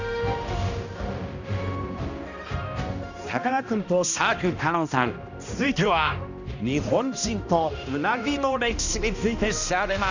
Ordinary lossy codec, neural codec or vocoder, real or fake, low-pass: none; codec, 16 kHz, 1 kbps, X-Codec, HuBERT features, trained on balanced general audio; fake; 7.2 kHz